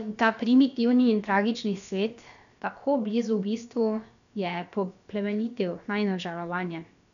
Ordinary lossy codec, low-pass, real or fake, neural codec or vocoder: none; 7.2 kHz; fake; codec, 16 kHz, about 1 kbps, DyCAST, with the encoder's durations